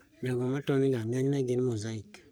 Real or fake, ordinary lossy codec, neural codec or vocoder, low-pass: fake; none; codec, 44.1 kHz, 3.4 kbps, Pupu-Codec; none